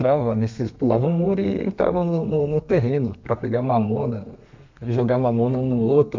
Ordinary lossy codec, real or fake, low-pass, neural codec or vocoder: MP3, 64 kbps; fake; 7.2 kHz; codec, 32 kHz, 1.9 kbps, SNAC